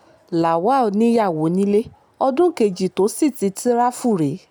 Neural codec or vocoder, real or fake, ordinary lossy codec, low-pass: none; real; none; none